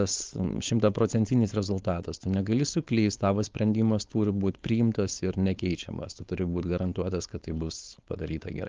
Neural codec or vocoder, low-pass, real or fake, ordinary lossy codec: codec, 16 kHz, 4.8 kbps, FACodec; 7.2 kHz; fake; Opus, 32 kbps